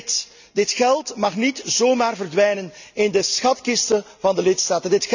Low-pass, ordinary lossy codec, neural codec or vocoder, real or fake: 7.2 kHz; none; none; real